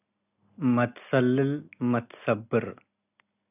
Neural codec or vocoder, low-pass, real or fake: none; 3.6 kHz; real